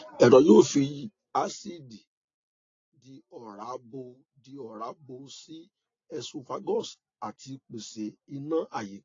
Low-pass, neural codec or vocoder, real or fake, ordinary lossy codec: 7.2 kHz; none; real; AAC, 32 kbps